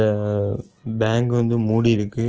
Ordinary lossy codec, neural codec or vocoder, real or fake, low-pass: Opus, 16 kbps; none; real; 7.2 kHz